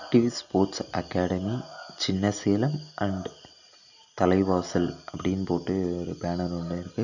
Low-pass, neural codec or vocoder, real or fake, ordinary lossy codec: 7.2 kHz; none; real; none